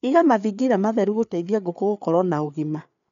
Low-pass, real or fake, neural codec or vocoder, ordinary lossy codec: 7.2 kHz; fake; codec, 16 kHz, 4 kbps, FreqCodec, larger model; none